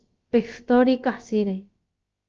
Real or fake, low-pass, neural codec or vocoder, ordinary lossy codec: fake; 7.2 kHz; codec, 16 kHz, about 1 kbps, DyCAST, with the encoder's durations; Opus, 24 kbps